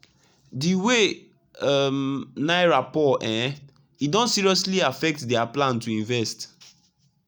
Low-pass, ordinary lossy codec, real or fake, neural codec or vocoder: none; none; real; none